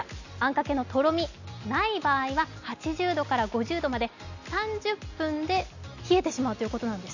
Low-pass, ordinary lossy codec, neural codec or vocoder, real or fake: 7.2 kHz; none; none; real